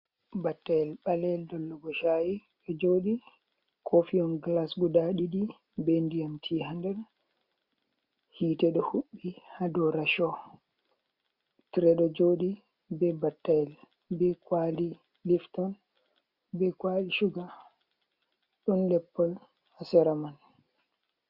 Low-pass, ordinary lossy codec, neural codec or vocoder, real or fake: 5.4 kHz; Opus, 64 kbps; none; real